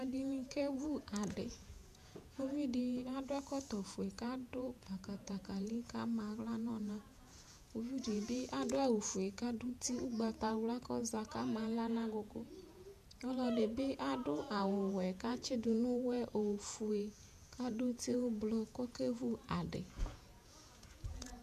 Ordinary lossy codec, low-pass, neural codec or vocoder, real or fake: MP3, 96 kbps; 14.4 kHz; vocoder, 48 kHz, 128 mel bands, Vocos; fake